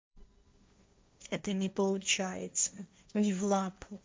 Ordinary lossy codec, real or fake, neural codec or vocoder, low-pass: none; fake; codec, 16 kHz, 1.1 kbps, Voila-Tokenizer; none